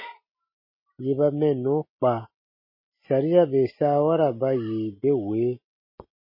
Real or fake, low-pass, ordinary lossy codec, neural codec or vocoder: real; 5.4 kHz; MP3, 24 kbps; none